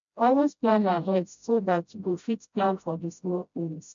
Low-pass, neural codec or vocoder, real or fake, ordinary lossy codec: 7.2 kHz; codec, 16 kHz, 0.5 kbps, FreqCodec, smaller model; fake; MP3, 48 kbps